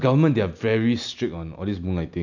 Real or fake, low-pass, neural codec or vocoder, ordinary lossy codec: real; 7.2 kHz; none; none